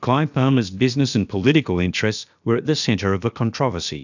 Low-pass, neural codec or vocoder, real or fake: 7.2 kHz; codec, 16 kHz, about 1 kbps, DyCAST, with the encoder's durations; fake